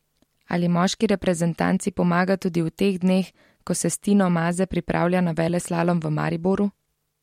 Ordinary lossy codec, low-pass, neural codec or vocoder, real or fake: MP3, 64 kbps; 19.8 kHz; none; real